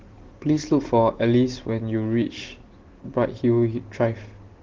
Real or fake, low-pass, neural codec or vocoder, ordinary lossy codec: real; 7.2 kHz; none; Opus, 16 kbps